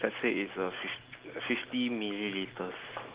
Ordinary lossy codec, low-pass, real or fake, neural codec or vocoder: Opus, 16 kbps; 3.6 kHz; real; none